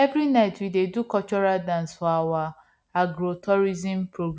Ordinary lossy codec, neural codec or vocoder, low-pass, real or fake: none; none; none; real